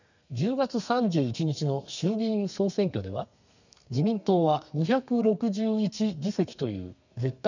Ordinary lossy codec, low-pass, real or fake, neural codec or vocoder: none; 7.2 kHz; fake; codec, 32 kHz, 1.9 kbps, SNAC